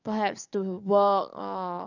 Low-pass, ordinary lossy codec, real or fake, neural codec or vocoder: 7.2 kHz; none; fake; codec, 44.1 kHz, 7.8 kbps, DAC